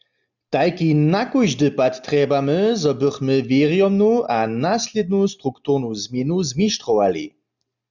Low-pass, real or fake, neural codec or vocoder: 7.2 kHz; real; none